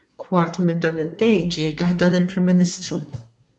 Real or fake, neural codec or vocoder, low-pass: fake; codec, 24 kHz, 1 kbps, SNAC; 10.8 kHz